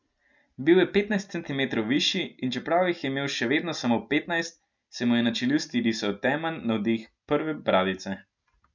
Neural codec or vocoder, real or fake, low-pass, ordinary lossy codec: none; real; 7.2 kHz; none